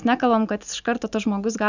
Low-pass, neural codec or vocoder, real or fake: 7.2 kHz; none; real